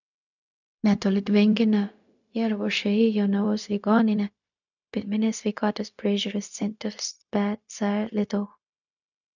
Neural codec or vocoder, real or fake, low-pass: codec, 16 kHz, 0.4 kbps, LongCat-Audio-Codec; fake; 7.2 kHz